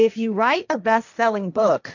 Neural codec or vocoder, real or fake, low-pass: codec, 16 kHz, 1.1 kbps, Voila-Tokenizer; fake; 7.2 kHz